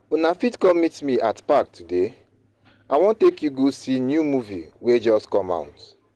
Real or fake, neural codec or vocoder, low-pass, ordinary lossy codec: real; none; 10.8 kHz; Opus, 16 kbps